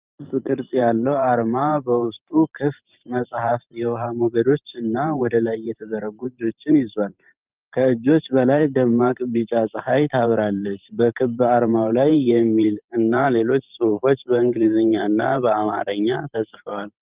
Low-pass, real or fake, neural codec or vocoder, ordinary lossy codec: 3.6 kHz; fake; vocoder, 44.1 kHz, 128 mel bands every 512 samples, BigVGAN v2; Opus, 32 kbps